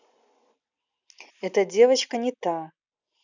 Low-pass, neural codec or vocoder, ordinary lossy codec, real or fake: 7.2 kHz; none; none; real